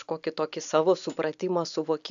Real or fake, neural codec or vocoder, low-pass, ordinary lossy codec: real; none; 7.2 kHz; AAC, 96 kbps